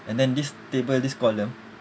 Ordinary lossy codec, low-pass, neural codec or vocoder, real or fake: none; none; none; real